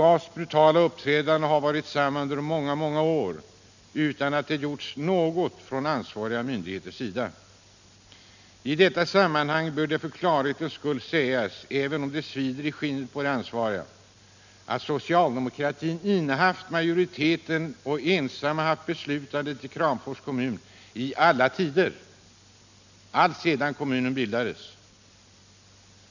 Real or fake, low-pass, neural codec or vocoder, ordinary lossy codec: real; 7.2 kHz; none; none